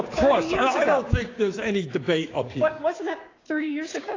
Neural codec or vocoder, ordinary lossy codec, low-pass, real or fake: codec, 16 kHz, 6 kbps, DAC; AAC, 32 kbps; 7.2 kHz; fake